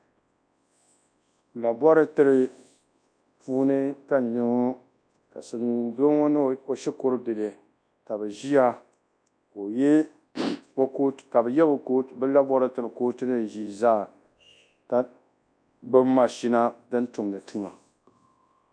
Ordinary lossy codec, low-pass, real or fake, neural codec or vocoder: MP3, 96 kbps; 9.9 kHz; fake; codec, 24 kHz, 0.9 kbps, WavTokenizer, large speech release